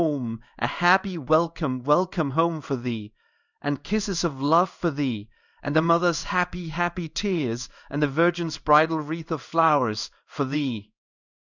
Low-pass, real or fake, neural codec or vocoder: 7.2 kHz; fake; codec, 16 kHz in and 24 kHz out, 1 kbps, XY-Tokenizer